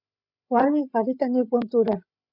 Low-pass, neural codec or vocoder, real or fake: 5.4 kHz; codec, 16 kHz, 8 kbps, FreqCodec, larger model; fake